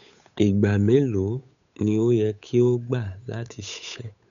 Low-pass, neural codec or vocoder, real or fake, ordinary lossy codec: 7.2 kHz; codec, 16 kHz, 8 kbps, FunCodec, trained on Chinese and English, 25 frames a second; fake; none